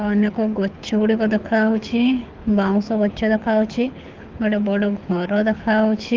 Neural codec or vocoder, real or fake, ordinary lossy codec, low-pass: codec, 24 kHz, 6 kbps, HILCodec; fake; Opus, 24 kbps; 7.2 kHz